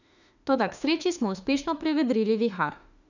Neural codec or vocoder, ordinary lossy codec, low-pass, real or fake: autoencoder, 48 kHz, 32 numbers a frame, DAC-VAE, trained on Japanese speech; none; 7.2 kHz; fake